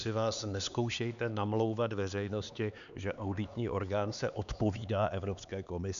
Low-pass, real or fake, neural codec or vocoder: 7.2 kHz; fake; codec, 16 kHz, 4 kbps, X-Codec, HuBERT features, trained on LibriSpeech